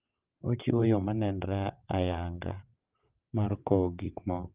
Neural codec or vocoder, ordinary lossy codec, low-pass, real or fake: vocoder, 22.05 kHz, 80 mel bands, WaveNeXt; Opus, 32 kbps; 3.6 kHz; fake